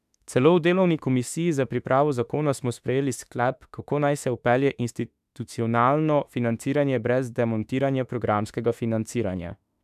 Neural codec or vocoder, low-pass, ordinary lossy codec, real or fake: autoencoder, 48 kHz, 32 numbers a frame, DAC-VAE, trained on Japanese speech; 14.4 kHz; none; fake